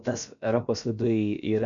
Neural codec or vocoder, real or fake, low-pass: codec, 16 kHz, about 1 kbps, DyCAST, with the encoder's durations; fake; 7.2 kHz